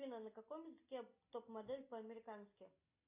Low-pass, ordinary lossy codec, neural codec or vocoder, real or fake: 3.6 kHz; AAC, 24 kbps; none; real